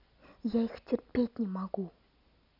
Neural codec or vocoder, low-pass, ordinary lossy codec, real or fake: none; 5.4 kHz; none; real